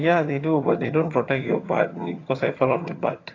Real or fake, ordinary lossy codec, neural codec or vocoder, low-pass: fake; AAC, 32 kbps; vocoder, 22.05 kHz, 80 mel bands, HiFi-GAN; 7.2 kHz